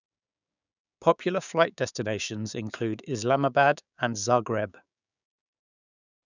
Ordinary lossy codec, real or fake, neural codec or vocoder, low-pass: none; fake; codec, 16 kHz, 6 kbps, DAC; 7.2 kHz